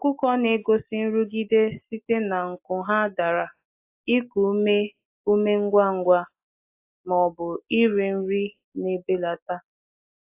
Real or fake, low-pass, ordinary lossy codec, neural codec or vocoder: real; 3.6 kHz; AAC, 32 kbps; none